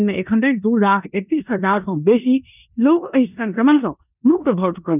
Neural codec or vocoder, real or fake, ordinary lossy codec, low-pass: codec, 16 kHz in and 24 kHz out, 0.9 kbps, LongCat-Audio-Codec, four codebook decoder; fake; none; 3.6 kHz